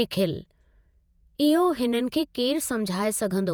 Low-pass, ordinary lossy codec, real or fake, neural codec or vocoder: none; none; fake; vocoder, 48 kHz, 128 mel bands, Vocos